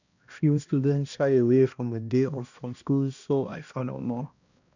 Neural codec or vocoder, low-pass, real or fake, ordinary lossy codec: codec, 16 kHz, 1 kbps, X-Codec, HuBERT features, trained on balanced general audio; 7.2 kHz; fake; none